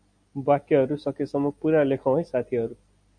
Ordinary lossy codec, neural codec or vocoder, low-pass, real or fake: MP3, 64 kbps; none; 9.9 kHz; real